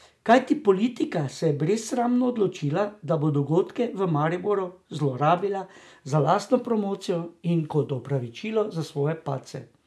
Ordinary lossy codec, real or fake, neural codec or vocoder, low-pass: none; real; none; none